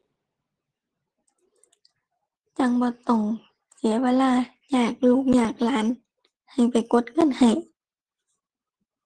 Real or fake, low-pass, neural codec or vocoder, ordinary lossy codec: real; 10.8 kHz; none; Opus, 16 kbps